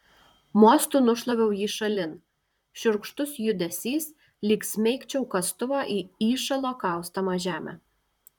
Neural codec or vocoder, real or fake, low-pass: vocoder, 44.1 kHz, 128 mel bands, Pupu-Vocoder; fake; 19.8 kHz